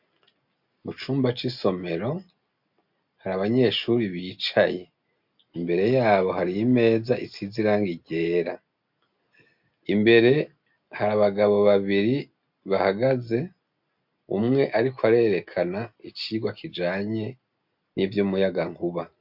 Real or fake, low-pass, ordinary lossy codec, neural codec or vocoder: real; 5.4 kHz; MP3, 48 kbps; none